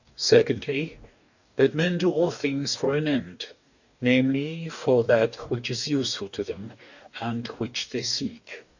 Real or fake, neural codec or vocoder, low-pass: fake; codec, 44.1 kHz, 2.6 kbps, DAC; 7.2 kHz